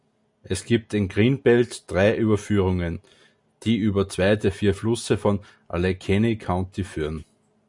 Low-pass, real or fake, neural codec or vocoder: 10.8 kHz; real; none